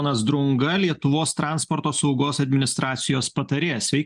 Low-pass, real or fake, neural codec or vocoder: 10.8 kHz; real; none